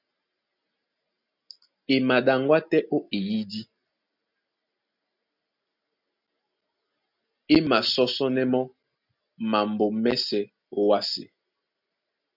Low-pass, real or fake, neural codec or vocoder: 5.4 kHz; real; none